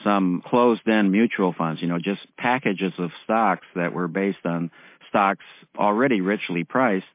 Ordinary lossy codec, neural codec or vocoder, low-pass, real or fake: MP3, 24 kbps; none; 3.6 kHz; real